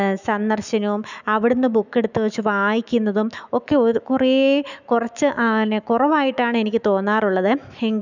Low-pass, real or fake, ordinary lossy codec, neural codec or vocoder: 7.2 kHz; fake; none; autoencoder, 48 kHz, 128 numbers a frame, DAC-VAE, trained on Japanese speech